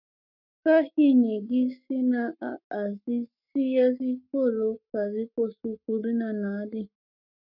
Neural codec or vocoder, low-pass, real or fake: codec, 44.1 kHz, 7.8 kbps, Pupu-Codec; 5.4 kHz; fake